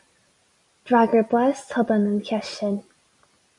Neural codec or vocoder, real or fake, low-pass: none; real; 10.8 kHz